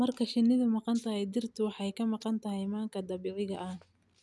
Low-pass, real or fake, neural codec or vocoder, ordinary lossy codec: none; real; none; none